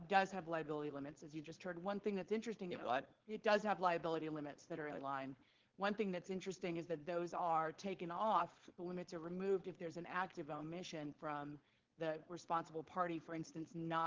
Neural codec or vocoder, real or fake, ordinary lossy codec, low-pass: codec, 16 kHz, 4.8 kbps, FACodec; fake; Opus, 16 kbps; 7.2 kHz